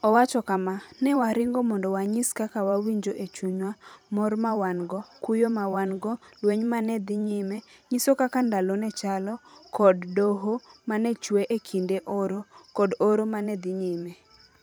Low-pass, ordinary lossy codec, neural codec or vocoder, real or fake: none; none; vocoder, 44.1 kHz, 128 mel bands every 512 samples, BigVGAN v2; fake